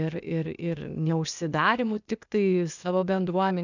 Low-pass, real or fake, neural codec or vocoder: 7.2 kHz; fake; codec, 16 kHz, 0.8 kbps, ZipCodec